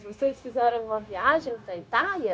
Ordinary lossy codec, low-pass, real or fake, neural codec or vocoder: none; none; fake; codec, 16 kHz, 0.9 kbps, LongCat-Audio-Codec